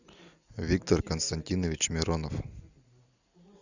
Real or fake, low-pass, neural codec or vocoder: real; 7.2 kHz; none